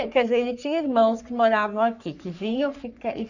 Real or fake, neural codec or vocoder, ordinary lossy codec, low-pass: fake; codec, 44.1 kHz, 3.4 kbps, Pupu-Codec; none; 7.2 kHz